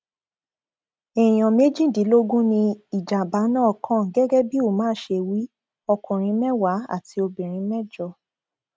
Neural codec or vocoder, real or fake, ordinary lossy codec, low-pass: none; real; none; none